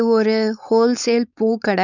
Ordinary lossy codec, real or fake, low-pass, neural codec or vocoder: none; fake; 7.2 kHz; codec, 16 kHz, 4.8 kbps, FACodec